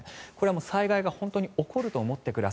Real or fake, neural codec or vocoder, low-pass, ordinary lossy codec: real; none; none; none